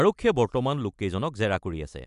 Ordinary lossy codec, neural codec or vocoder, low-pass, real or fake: AAC, 96 kbps; none; 10.8 kHz; real